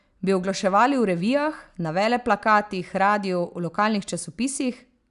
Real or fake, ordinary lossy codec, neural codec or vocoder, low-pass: real; none; none; 10.8 kHz